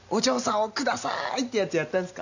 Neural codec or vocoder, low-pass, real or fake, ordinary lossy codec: none; 7.2 kHz; real; none